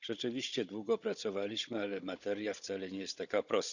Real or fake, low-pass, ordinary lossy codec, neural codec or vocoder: fake; 7.2 kHz; none; codec, 16 kHz, 16 kbps, FunCodec, trained on Chinese and English, 50 frames a second